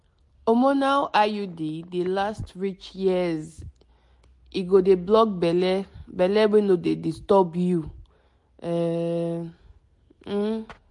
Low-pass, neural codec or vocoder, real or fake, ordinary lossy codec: 10.8 kHz; none; real; MP3, 48 kbps